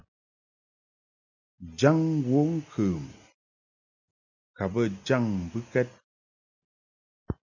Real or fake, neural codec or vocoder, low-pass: real; none; 7.2 kHz